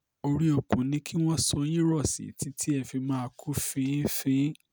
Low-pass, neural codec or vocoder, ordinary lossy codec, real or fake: none; none; none; real